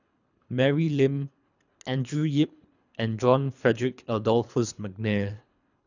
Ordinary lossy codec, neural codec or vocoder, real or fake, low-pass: none; codec, 24 kHz, 3 kbps, HILCodec; fake; 7.2 kHz